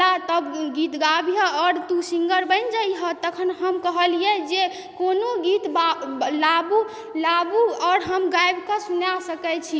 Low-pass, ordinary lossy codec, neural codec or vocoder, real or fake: none; none; none; real